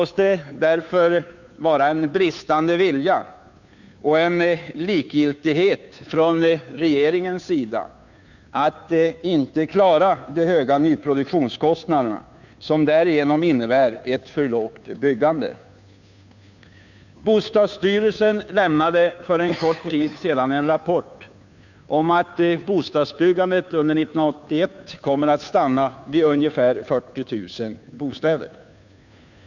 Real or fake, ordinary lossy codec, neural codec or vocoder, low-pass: fake; none; codec, 16 kHz, 2 kbps, FunCodec, trained on Chinese and English, 25 frames a second; 7.2 kHz